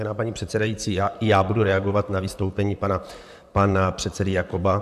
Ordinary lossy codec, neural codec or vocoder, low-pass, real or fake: AAC, 96 kbps; vocoder, 44.1 kHz, 128 mel bands, Pupu-Vocoder; 14.4 kHz; fake